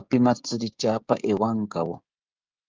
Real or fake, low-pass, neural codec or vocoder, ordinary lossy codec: fake; 7.2 kHz; codec, 16 kHz, 16 kbps, FreqCodec, smaller model; Opus, 24 kbps